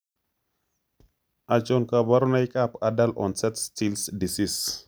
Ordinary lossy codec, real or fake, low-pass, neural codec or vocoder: none; real; none; none